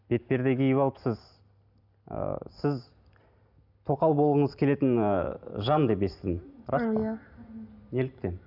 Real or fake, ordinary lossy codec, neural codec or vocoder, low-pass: real; none; none; 5.4 kHz